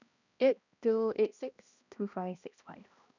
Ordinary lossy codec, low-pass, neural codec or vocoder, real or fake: none; 7.2 kHz; codec, 16 kHz, 1 kbps, X-Codec, HuBERT features, trained on balanced general audio; fake